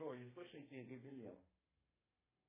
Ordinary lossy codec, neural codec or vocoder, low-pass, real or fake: MP3, 16 kbps; codec, 32 kHz, 1.9 kbps, SNAC; 3.6 kHz; fake